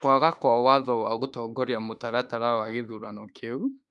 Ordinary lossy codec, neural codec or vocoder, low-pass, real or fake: none; autoencoder, 48 kHz, 32 numbers a frame, DAC-VAE, trained on Japanese speech; 10.8 kHz; fake